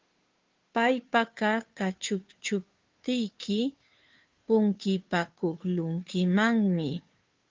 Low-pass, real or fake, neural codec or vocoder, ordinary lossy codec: 7.2 kHz; fake; codec, 16 kHz, 2 kbps, FunCodec, trained on Chinese and English, 25 frames a second; Opus, 32 kbps